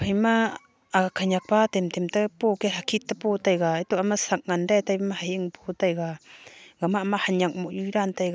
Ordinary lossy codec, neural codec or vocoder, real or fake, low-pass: none; none; real; none